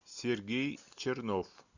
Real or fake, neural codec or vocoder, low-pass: real; none; 7.2 kHz